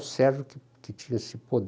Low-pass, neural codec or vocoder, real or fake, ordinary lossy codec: none; none; real; none